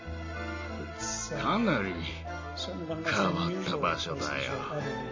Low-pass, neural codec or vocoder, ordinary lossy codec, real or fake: 7.2 kHz; none; MP3, 32 kbps; real